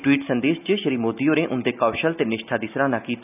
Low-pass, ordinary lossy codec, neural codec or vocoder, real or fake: 3.6 kHz; none; none; real